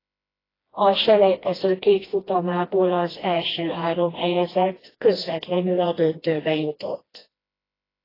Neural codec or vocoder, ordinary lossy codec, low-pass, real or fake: codec, 16 kHz, 1 kbps, FreqCodec, smaller model; AAC, 24 kbps; 5.4 kHz; fake